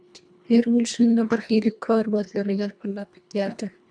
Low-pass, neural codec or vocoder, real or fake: 9.9 kHz; codec, 24 kHz, 1.5 kbps, HILCodec; fake